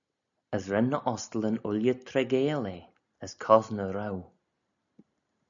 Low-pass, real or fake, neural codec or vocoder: 7.2 kHz; real; none